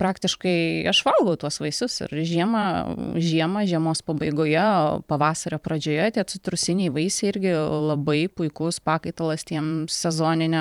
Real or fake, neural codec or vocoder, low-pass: fake; vocoder, 44.1 kHz, 128 mel bands every 512 samples, BigVGAN v2; 19.8 kHz